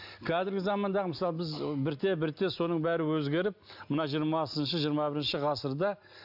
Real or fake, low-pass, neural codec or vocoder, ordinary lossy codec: real; 5.4 kHz; none; AAC, 48 kbps